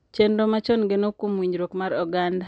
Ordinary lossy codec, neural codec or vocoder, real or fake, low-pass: none; none; real; none